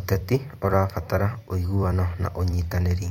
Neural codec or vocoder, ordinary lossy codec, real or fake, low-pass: vocoder, 44.1 kHz, 128 mel bands every 256 samples, BigVGAN v2; MP3, 64 kbps; fake; 19.8 kHz